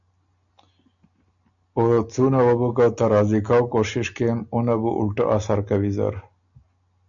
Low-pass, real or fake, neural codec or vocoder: 7.2 kHz; real; none